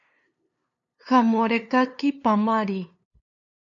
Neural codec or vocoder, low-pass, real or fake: codec, 16 kHz, 2 kbps, FunCodec, trained on LibriTTS, 25 frames a second; 7.2 kHz; fake